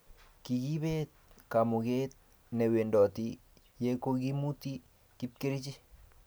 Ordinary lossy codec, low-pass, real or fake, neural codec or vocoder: none; none; real; none